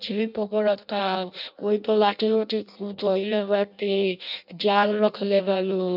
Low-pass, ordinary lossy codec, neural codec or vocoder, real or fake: 5.4 kHz; none; codec, 16 kHz in and 24 kHz out, 0.6 kbps, FireRedTTS-2 codec; fake